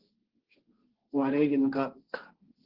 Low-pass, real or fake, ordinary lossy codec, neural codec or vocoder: 5.4 kHz; fake; Opus, 16 kbps; codec, 16 kHz, 1.1 kbps, Voila-Tokenizer